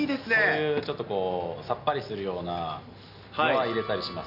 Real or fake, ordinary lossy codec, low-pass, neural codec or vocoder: real; none; 5.4 kHz; none